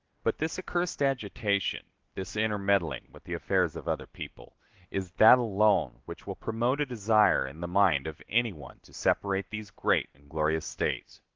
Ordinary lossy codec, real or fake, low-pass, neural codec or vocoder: Opus, 16 kbps; real; 7.2 kHz; none